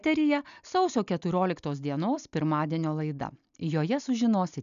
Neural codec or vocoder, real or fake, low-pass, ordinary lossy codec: none; real; 7.2 kHz; MP3, 96 kbps